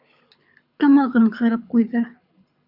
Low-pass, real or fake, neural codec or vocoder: 5.4 kHz; fake; codec, 24 kHz, 6 kbps, HILCodec